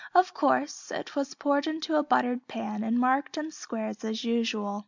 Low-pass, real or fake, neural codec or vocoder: 7.2 kHz; real; none